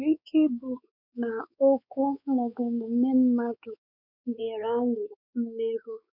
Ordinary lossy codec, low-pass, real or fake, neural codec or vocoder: none; 5.4 kHz; fake; codec, 16 kHz, 4 kbps, X-Codec, WavLM features, trained on Multilingual LibriSpeech